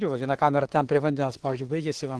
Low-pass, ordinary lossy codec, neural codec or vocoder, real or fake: 10.8 kHz; Opus, 16 kbps; autoencoder, 48 kHz, 32 numbers a frame, DAC-VAE, trained on Japanese speech; fake